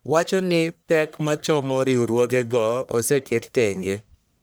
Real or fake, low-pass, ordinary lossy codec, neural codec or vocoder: fake; none; none; codec, 44.1 kHz, 1.7 kbps, Pupu-Codec